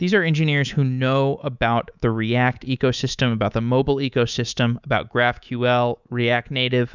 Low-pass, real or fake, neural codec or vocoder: 7.2 kHz; fake; codec, 24 kHz, 3.1 kbps, DualCodec